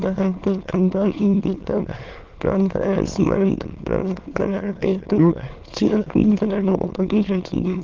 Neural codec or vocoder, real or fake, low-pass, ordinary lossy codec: autoencoder, 22.05 kHz, a latent of 192 numbers a frame, VITS, trained on many speakers; fake; 7.2 kHz; Opus, 16 kbps